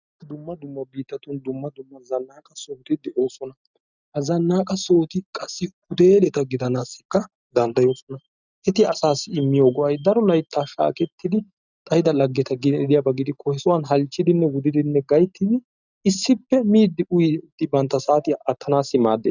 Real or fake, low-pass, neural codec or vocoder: real; 7.2 kHz; none